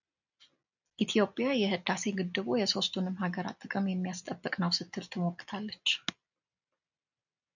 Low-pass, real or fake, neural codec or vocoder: 7.2 kHz; real; none